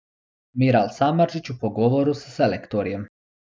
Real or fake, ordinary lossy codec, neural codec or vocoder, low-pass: real; none; none; none